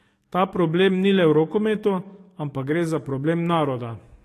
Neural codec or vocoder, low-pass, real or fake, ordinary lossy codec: codec, 44.1 kHz, 7.8 kbps, DAC; 14.4 kHz; fake; AAC, 48 kbps